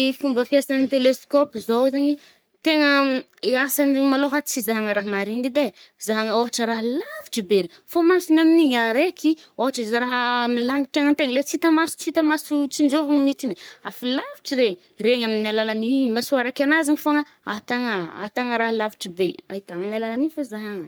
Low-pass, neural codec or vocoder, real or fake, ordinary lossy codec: none; codec, 44.1 kHz, 3.4 kbps, Pupu-Codec; fake; none